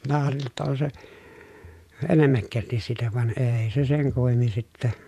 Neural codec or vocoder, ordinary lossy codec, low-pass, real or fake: vocoder, 48 kHz, 128 mel bands, Vocos; none; 14.4 kHz; fake